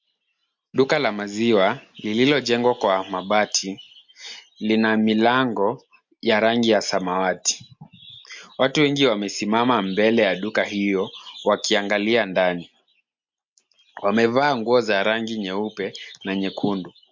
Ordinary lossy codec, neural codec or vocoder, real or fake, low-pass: MP3, 48 kbps; none; real; 7.2 kHz